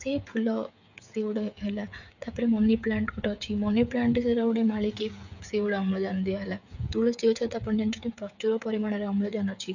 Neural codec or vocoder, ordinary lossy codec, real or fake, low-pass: codec, 16 kHz, 8 kbps, FreqCodec, smaller model; none; fake; 7.2 kHz